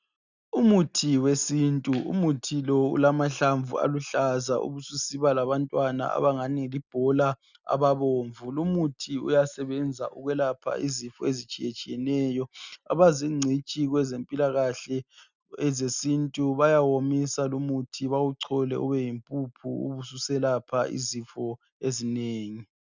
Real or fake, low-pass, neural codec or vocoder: real; 7.2 kHz; none